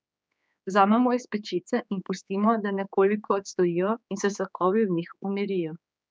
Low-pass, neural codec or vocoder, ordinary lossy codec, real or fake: none; codec, 16 kHz, 4 kbps, X-Codec, HuBERT features, trained on general audio; none; fake